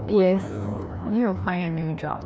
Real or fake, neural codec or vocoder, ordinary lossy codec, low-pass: fake; codec, 16 kHz, 1 kbps, FreqCodec, larger model; none; none